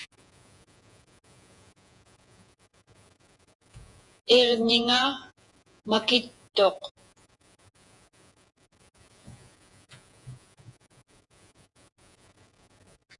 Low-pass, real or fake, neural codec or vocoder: 10.8 kHz; fake; vocoder, 48 kHz, 128 mel bands, Vocos